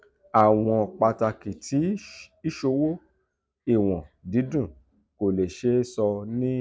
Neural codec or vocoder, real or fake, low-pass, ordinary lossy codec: none; real; none; none